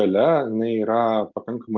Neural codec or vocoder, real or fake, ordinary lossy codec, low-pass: none; real; Opus, 24 kbps; 7.2 kHz